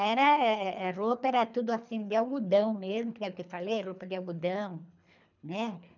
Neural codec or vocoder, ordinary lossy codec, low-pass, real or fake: codec, 24 kHz, 3 kbps, HILCodec; none; 7.2 kHz; fake